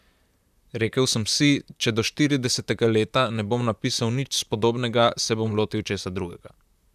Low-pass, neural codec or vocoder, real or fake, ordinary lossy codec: 14.4 kHz; vocoder, 44.1 kHz, 128 mel bands, Pupu-Vocoder; fake; none